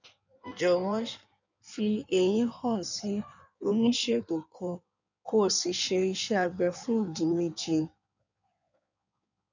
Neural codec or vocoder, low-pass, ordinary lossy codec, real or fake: codec, 16 kHz in and 24 kHz out, 1.1 kbps, FireRedTTS-2 codec; 7.2 kHz; none; fake